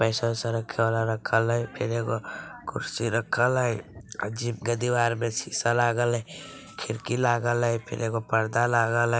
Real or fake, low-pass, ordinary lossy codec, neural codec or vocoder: real; none; none; none